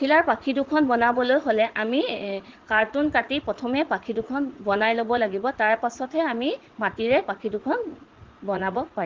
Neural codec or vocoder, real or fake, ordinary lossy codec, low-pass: vocoder, 44.1 kHz, 80 mel bands, Vocos; fake; Opus, 16 kbps; 7.2 kHz